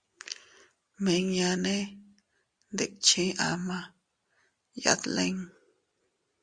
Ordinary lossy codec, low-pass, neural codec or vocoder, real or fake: Opus, 64 kbps; 9.9 kHz; none; real